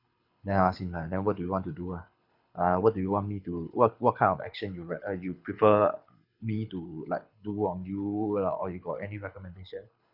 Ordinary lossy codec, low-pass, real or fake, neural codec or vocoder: none; 5.4 kHz; fake; codec, 24 kHz, 6 kbps, HILCodec